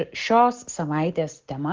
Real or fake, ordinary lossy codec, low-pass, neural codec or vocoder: real; Opus, 16 kbps; 7.2 kHz; none